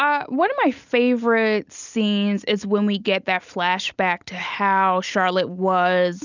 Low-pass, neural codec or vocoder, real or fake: 7.2 kHz; none; real